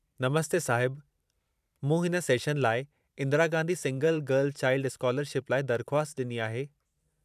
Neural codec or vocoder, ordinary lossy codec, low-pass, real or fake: vocoder, 44.1 kHz, 128 mel bands every 512 samples, BigVGAN v2; none; 14.4 kHz; fake